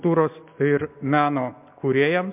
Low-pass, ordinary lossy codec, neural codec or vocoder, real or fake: 3.6 kHz; MP3, 32 kbps; none; real